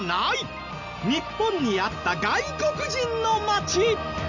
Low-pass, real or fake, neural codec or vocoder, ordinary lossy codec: 7.2 kHz; real; none; none